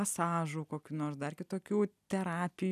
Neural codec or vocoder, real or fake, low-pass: none; real; 14.4 kHz